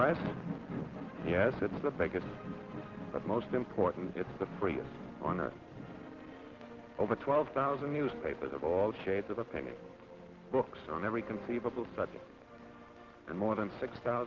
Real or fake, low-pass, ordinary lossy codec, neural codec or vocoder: real; 7.2 kHz; Opus, 16 kbps; none